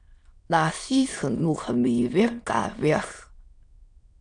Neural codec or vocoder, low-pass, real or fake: autoencoder, 22.05 kHz, a latent of 192 numbers a frame, VITS, trained on many speakers; 9.9 kHz; fake